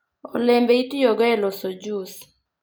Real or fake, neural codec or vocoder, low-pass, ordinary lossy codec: real; none; none; none